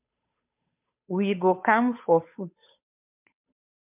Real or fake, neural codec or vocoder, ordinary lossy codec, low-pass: fake; codec, 16 kHz, 8 kbps, FunCodec, trained on Chinese and English, 25 frames a second; MP3, 32 kbps; 3.6 kHz